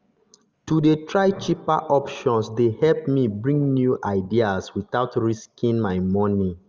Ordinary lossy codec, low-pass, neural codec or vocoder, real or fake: Opus, 24 kbps; 7.2 kHz; none; real